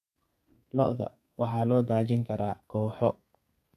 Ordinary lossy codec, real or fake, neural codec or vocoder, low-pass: none; fake; codec, 32 kHz, 1.9 kbps, SNAC; 14.4 kHz